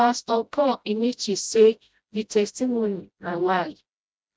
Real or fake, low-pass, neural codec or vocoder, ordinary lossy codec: fake; none; codec, 16 kHz, 0.5 kbps, FreqCodec, smaller model; none